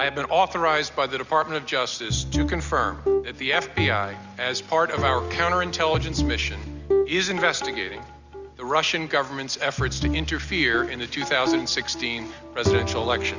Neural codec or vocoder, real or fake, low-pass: none; real; 7.2 kHz